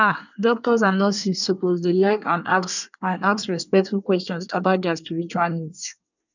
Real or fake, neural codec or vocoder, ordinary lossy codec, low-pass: fake; codec, 24 kHz, 1 kbps, SNAC; none; 7.2 kHz